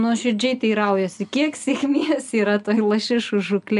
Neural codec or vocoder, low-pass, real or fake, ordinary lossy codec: none; 10.8 kHz; real; AAC, 96 kbps